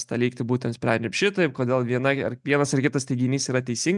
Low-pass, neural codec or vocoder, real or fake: 10.8 kHz; none; real